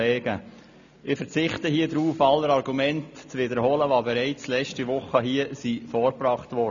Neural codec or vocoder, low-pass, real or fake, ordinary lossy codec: none; 7.2 kHz; real; none